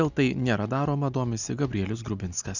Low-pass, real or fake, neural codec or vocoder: 7.2 kHz; real; none